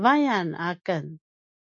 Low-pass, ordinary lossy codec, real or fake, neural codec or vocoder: 7.2 kHz; MP3, 64 kbps; real; none